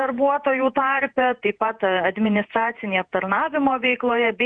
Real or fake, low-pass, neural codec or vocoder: fake; 9.9 kHz; vocoder, 48 kHz, 128 mel bands, Vocos